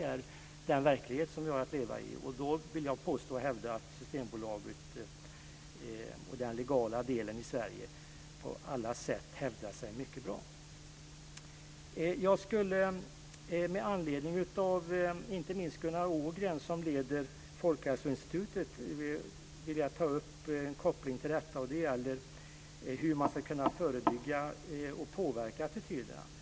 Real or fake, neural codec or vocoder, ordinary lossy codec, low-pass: real; none; none; none